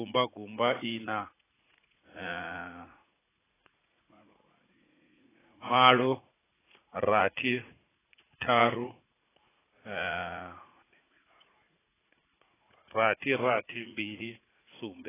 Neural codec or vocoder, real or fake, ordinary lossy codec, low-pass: vocoder, 44.1 kHz, 80 mel bands, Vocos; fake; AAC, 16 kbps; 3.6 kHz